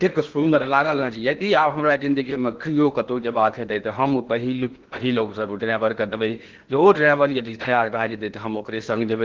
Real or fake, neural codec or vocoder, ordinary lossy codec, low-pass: fake; codec, 16 kHz in and 24 kHz out, 0.8 kbps, FocalCodec, streaming, 65536 codes; Opus, 16 kbps; 7.2 kHz